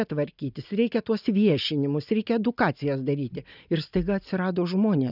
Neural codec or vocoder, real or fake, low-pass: none; real; 5.4 kHz